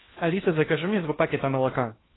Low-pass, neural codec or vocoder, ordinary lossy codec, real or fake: 7.2 kHz; codec, 16 kHz in and 24 kHz out, 0.8 kbps, FocalCodec, streaming, 65536 codes; AAC, 16 kbps; fake